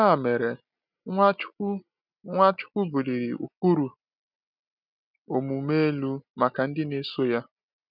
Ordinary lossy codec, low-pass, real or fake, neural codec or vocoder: none; 5.4 kHz; real; none